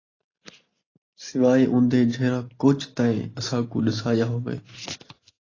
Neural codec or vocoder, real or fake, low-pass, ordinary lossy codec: none; real; 7.2 kHz; AAC, 32 kbps